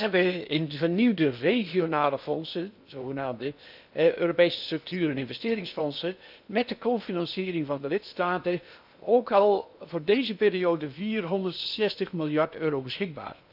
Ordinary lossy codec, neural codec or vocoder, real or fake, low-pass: none; codec, 16 kHz in and 24 kHz out, 0.8 kbps, FocalCodec, streaming, 65536 codes; fake; 5.4 kHz